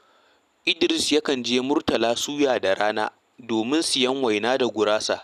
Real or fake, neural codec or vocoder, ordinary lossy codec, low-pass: real; none; none; 14.4 kHz